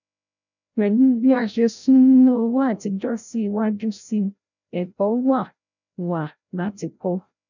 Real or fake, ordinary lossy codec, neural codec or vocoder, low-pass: fake; none; codec, 16 kHz, 0.5 kbps, FreqCodec, larger model; 7.2 kHz